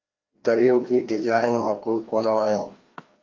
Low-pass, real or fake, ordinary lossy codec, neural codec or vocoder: 7.2 kHz; fake; Opus, 32 kbps; codec, 16 kHz, 1 kbps, FreqCodec, larger model